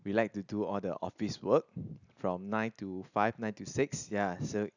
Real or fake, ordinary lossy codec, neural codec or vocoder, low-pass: real; none; none; 7.2 kHz